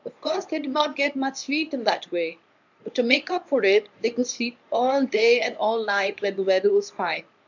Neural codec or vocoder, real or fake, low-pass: codec, 24 kHz, 0.9 kbps, WavTokenizer, medium speech release version 1; fake; 7.2 kHz